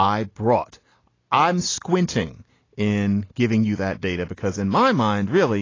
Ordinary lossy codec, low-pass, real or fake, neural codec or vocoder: AAC, 32 kbps; 7.2 kHz; real; none